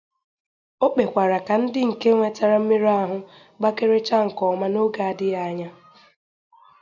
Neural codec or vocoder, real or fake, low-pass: none; real; 7.2 kHz